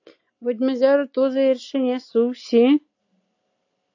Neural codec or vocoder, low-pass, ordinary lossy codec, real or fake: none; 7.2 kHz; AAC, 48 kbps; real